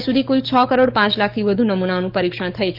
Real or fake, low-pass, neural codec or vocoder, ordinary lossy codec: fake; 5.4 kHz; codec, 44.1 kHz, 7.8 kbps, DAC; Opus, 32 kbps